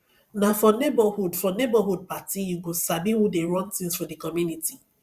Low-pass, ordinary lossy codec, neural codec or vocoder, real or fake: 14.4 kHz; Opus, 64 kbps; vocoder, 44.1 kHz, 128 mel bands every 512 samples, BigVGAN v2; fake